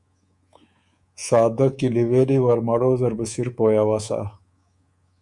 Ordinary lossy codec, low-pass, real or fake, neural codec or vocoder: Opus, 64 kbps; 10.8 kHz; fake; codec, 24 kHz, 3.1 kbps, DualCodec